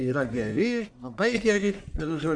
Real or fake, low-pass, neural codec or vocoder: fake; 9.9 kHz; codec, 44.1 kHz, 1.7 kbps, Pupu-Codec